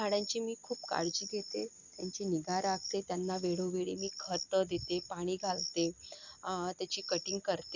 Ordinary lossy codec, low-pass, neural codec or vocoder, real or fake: Opus, 64 kbps; 7.2 kHz; none; real